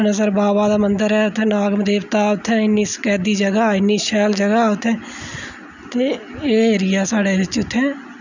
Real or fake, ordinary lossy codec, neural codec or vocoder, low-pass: real; none; none; 7.2 kHz